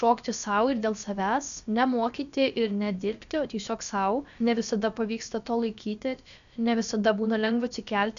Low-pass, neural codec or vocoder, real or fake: 7.2 kHz; codec, 16 kHz, about 1 kbps, DyCAST, with the encoder's durations; fake